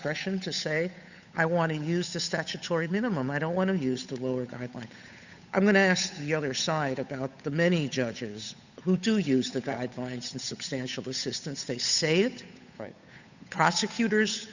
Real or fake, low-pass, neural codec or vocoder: fake; 7.2 kHz; codec, 16 kHz, 8 kbps, FunCodec, trained on Chinese and English, 25 frames a second